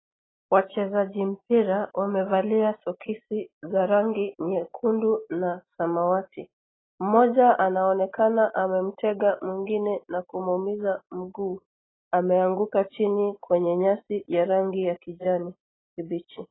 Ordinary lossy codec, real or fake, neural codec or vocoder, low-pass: AAC, 16 kbps; real; none; 7.2 kHz